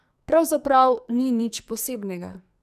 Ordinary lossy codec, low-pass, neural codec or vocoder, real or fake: none; 14.4 kHz; codec, 44.1 kHz, 2.6 kbps, SNAC; fake